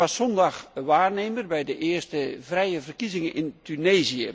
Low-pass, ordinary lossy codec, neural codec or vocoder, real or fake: none; none; none; real